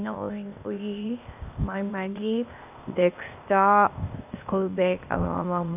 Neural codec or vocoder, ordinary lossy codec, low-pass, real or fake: codec, 16 kHz, 0.8 kbps, ZipCodec; none; 3.6 kHz; fake